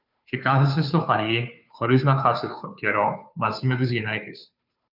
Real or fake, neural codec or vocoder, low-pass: fake; codec, 16 kHz, 2 kbps, FunCodec, trained on Chinese and English, 25 frames a second; 5.4 kHz